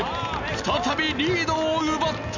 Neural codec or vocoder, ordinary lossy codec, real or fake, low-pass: none; none; real; 7.2 kHz